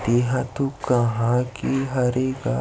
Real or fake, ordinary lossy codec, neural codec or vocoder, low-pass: real; none; none; none